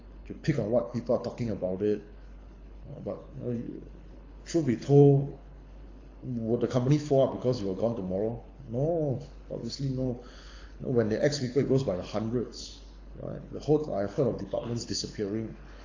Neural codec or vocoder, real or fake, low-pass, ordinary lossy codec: codec, 24 kHz, 6 kbps, HILCodec; fake; 7.2 kHz; AAC, 32 kbps